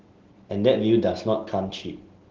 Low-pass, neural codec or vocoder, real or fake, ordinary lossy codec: 7.2 kHz; none; real; Opus, 16 kbps